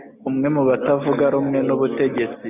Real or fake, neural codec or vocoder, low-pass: real; none; 3.6 kHz